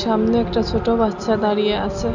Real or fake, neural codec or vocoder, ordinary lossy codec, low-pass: real; none; none; 7.2 kHz